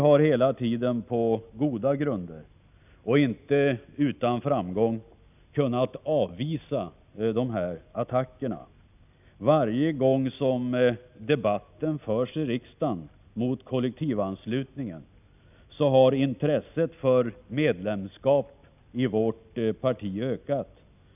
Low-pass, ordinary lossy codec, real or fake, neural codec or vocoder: 3.6 kHz; none; real; none